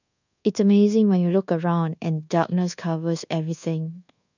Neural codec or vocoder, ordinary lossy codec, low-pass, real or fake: codec, 24 kHz, 1.2 kbps, DualCodec; none; 7.2 kHz; fake